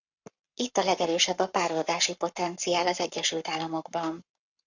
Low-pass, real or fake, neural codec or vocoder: 7.2 kHz; fake; codec, 44.1 kHz, 7.8 kbps, Pupu-Codec